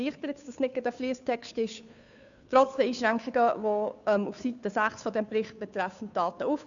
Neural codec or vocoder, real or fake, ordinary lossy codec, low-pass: codec, 16 kHz, 2 kbps, FunCodec, trained on Chinese and English, 25 frames a second; fake; none; 7.2 kHz